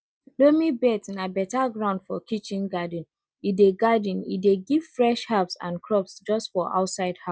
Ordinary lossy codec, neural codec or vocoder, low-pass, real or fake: none; none; none; real